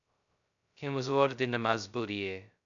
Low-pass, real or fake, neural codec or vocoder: 7.2 kHz; fake; codec, 16 kHz, 0.2 kbps, FocalCodec